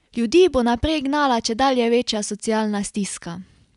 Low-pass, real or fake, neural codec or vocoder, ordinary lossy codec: 10.8 kHz; real; none; none